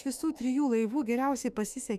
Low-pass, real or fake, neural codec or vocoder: 14.4 kHz; fake; autoencoder, 48 kHz, 32 numbers a frame, DAC-VAE, trained on Japanese speech